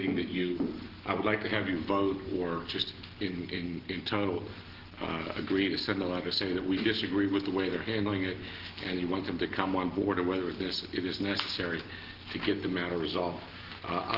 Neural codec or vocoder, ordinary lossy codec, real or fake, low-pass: none; Opus, 16 kbps; real; 5.4 kHz